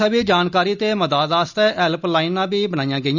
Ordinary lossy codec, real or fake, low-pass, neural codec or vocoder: none; real; 7.2 kHz; none